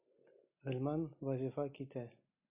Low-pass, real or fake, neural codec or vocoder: 3.6 kHz; real; none